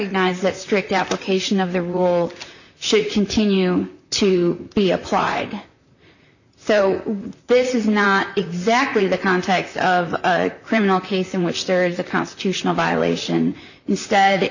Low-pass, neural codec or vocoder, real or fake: 7.2 kHz; vocoder, 44.1 kHz, 128 mel bands, Pupu-Vocoder; fake